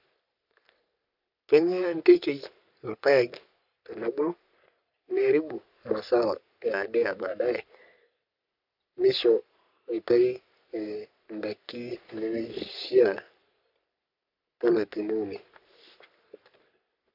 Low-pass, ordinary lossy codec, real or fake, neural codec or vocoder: 5.4 kHz; none; fake; codec, 44.1 kHz, 3.4 kbps, Pupu-Codec